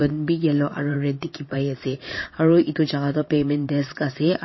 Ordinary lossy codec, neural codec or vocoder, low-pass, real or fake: MP3, 24 kbps; vocoder, 22.05 kHz, 80 mel bands, Vocos; 7.2 kHz; fake